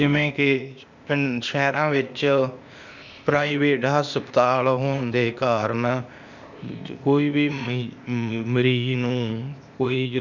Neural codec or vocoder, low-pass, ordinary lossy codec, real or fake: codec, 16 kHz, 0.8 kbps, ZipCodec; 7.2 kHz; none; fake